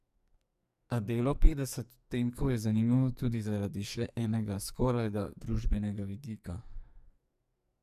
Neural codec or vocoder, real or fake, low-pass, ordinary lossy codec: codec, 44.1 kHz, 2.6 kbps, SNAC; fake; 14.4 kHz; none